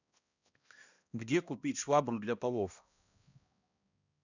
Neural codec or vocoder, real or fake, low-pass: codec, 16 kHz, 1 kbps, X-Codec, HuBERT features, trained on balanced general audio; fake; 7.2 kHz